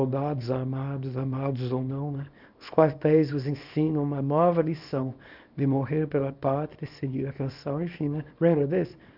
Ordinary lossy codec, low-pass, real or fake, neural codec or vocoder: none; 5.4 kHz; fake; codec, 24 kHz, 0.9 kbps, WavTokenizer, small release